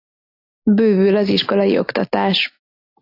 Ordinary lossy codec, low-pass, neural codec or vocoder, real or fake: AAC, 32 kbps; 5.4 kHz; none; real